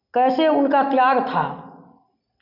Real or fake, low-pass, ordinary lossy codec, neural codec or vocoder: real; 5.4 kHz; none; none